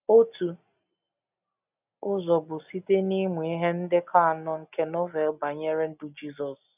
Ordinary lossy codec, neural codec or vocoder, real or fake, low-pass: none; none; real; 3.6 kHz